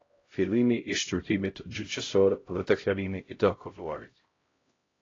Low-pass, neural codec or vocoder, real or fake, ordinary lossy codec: 7.2 kHz; codec, 16 kHz, 0.5 kbps, X-Codec, HuBERT features, trained on LibriSpeech; fake; AAC, 32 kbps